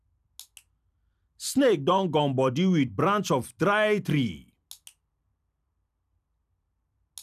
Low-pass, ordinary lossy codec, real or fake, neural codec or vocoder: 14.4 kHz; none; fake; vocoder, 44.1 kHz, 128 mel bands every 256 samples, BigVGAN v2